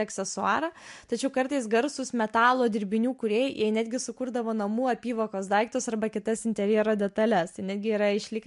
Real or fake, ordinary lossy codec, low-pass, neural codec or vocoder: real; MP3, 64 kbps; 10.8 kHz; none